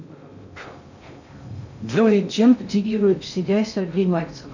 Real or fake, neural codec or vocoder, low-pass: fake; codec, 16 kHz in and 24 kHz out, 0.6 kbps, FocalCodec, streaming, 2048 codes; 7.2 kHz